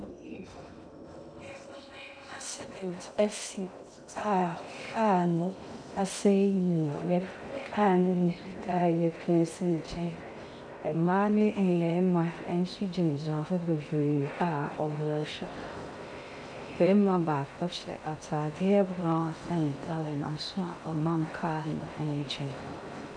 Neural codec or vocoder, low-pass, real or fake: codec, 16 kHz in and 24 kHz out, 0.6 kbps, FocalCodec, streaming, 2048 codes; 9.9 kHz; fake